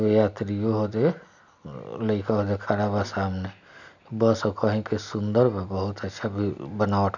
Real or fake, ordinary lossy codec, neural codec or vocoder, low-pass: real; none; none; 7.2 kHz